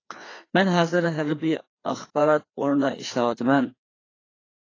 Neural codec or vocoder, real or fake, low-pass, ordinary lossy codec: codec, 16 kHz, 2 kbps, FreqCodec, larger model; fake; 7.2 kHz; AAC, 32 kbps